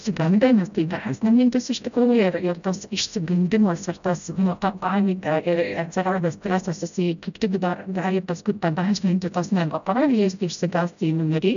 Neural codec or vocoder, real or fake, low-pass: codec, 16 kHz, 0.5 kbps, FreqCodec, smaller model; fake; 7.2 kHz